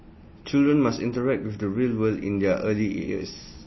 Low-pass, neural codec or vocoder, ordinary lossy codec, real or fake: 7.2 kHz; none; MP3, 24 kbps; real